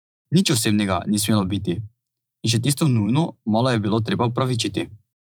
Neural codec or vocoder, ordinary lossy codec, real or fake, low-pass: vocoder, 44.1 kHz, 128 mel bands every 512 samples, BigVGAN v2; none; fake; none